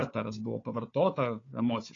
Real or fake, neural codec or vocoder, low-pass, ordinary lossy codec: fake; codec, 16 kHz, 4 kbps, FunCodec, trained on Chinese and English, 50 frames a second; 7.2 kHz; AAC, 32 kbps